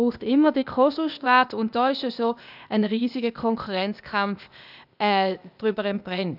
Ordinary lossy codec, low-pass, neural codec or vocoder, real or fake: none; 5.4 kHz; codec, 16 kHz, 0.8 kbps, ZipCodec; fake